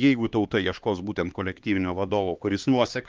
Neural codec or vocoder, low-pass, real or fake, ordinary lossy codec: codec, 16 kHz, 2 kbps, X-Codec, HuBERT features, trained on LibriSpeech; 7.2 kHz; fake; Opus, 32 kbps